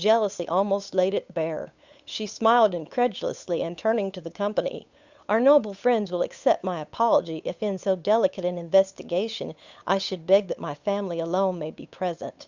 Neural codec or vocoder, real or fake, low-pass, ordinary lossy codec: codec, 16 kHz, 8 kbps, FunCodec, trained on Chinese and English, 25 frames a second; fake; 7.2 kHz; Opus, 64 kbps